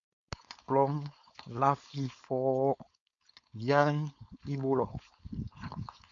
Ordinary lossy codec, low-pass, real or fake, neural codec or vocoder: AAC, 64 kbps; 7.2 kHz; fake; codec, 16 kHz, 4.8 kbps, FACodec